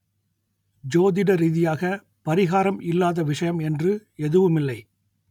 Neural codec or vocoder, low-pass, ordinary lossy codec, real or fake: none; 19.8 kHz; none; real